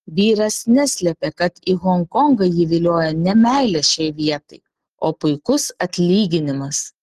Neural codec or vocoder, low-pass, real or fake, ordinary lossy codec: none; 14.4 kHz; real; Opus, 16 kbps